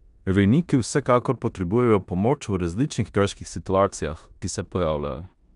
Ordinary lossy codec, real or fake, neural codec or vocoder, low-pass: none; fake; codec, 16 kHz in and 24 kHz out, 0.9 kbps, LongCat-Audio-Codec, four codebook decoder; 10.8 kHz